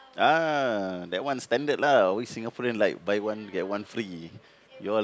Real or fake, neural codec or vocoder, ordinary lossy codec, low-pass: real; none; none; none